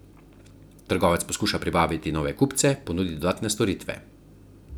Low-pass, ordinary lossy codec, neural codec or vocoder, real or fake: none; none; none; real